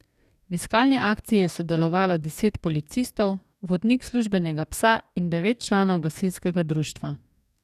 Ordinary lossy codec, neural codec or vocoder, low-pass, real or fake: none; codec, 44.1 kHz, 2.6 kbps, DAC; 14.4 kHz; fake